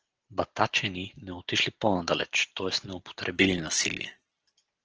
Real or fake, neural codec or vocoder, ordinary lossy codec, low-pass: real; none; Opus, 16 kbps; 7.2 kHz